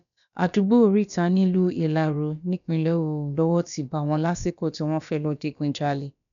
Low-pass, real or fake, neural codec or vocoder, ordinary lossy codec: 7.2 kHz; fake; codec, 16 kHz, about 1 kbps, DyCAST, with the encoder's durations; none